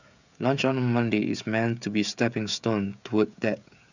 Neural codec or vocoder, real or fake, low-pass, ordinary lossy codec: codec, 16 kHz, 16 kbps, FreqCodec, smaller model; fake; 7.2 kHz; none